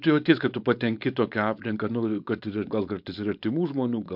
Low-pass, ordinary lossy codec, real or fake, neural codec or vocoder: 5.4 kHz; MP3, 48 kbps; fake; codec, 16 kHz, 4.8 kbps, FACodec